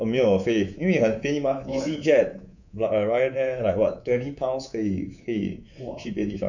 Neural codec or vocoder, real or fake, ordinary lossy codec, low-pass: codec, 24 kHz, 3.1 kbps, DualCodec; fake; none; 7.2 kHz